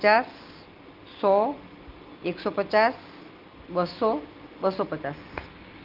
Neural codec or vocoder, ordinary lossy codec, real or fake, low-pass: none; Opus, 24 kbps; real; 5.4 kHz